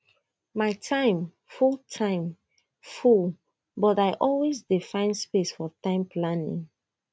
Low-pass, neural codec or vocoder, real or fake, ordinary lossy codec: none; none; real; none